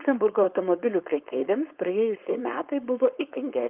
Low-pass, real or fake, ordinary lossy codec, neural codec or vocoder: 3.6 kHz; fake; Opus, 24 kbps; codec, 16 kHz, 4.8 kbps, FACodec